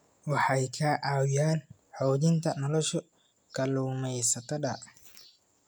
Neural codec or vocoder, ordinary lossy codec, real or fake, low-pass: none; none; real; none